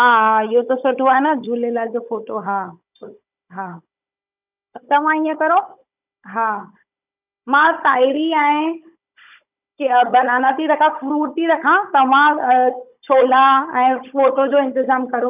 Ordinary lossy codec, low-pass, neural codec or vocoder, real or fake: none; 3.6 kHz; codec, 16 kHz, 16 kbps, FunCodec, trained on Chinese and English, 50 frames a second; fake